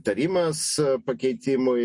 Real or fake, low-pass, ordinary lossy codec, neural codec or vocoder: real; 10.8 kHz; MP3, 48 kbps; none